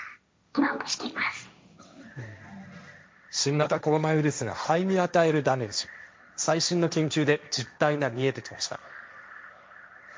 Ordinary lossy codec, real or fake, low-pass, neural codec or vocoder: none; fake; none; codec, 16 kHz, 1.1 kbps, Voila-Tokenizer